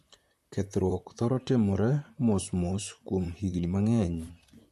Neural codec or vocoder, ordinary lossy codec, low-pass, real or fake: vocoder, 44.1 kHz, 128 mel bands, Pupu-Vocoder; MP3, 64 kbps; 14.4 kHz; fake